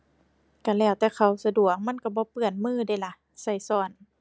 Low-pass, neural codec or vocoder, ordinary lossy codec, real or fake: none; none; none; real